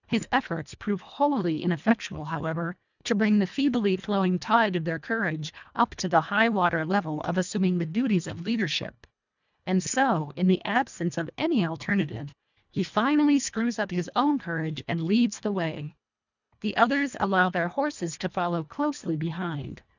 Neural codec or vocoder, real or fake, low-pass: codec, 24 kHz, 1.5 kbps, HILCodec; fake; 7.2 kHz